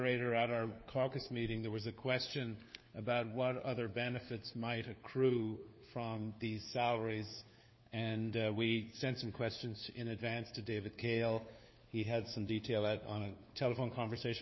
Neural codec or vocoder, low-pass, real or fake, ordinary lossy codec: codec, 16 kHz, 4 kbps, FunCodec, trained on LibriTTS, 50 frames a second; 7.2 kHz; fake; MP3, 24 kbps